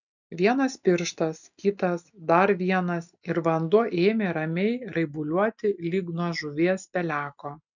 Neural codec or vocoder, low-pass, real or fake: none; 7.2 kHz; real